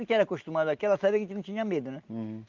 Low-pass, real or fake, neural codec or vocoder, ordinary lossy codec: 7.2 kHz; real; none; Opus, 32 kbps